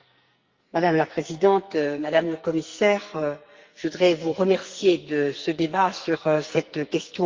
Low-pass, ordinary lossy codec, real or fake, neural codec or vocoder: 7.2 kHz; Opus, 32 kbps; fake; codec, 44.1 kHz, 2.6 kbps, SNAC